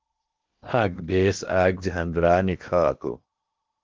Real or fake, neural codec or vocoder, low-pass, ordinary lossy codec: fake; codec, 16 kHz in and 24 kHz out, 0.8 kbps, FocalCodec, streaming, 65536 codes; 7.2 kHz; Opus, 32 kbps